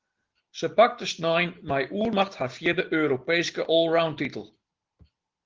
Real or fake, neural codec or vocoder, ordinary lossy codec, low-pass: real; none; Opus, 16 kbps; 7.2 kHz